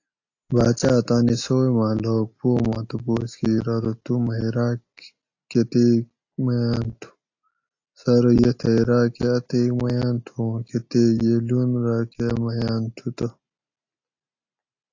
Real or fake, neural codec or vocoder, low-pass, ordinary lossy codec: real; none; 7.2 kHz; AAC, 48 kbps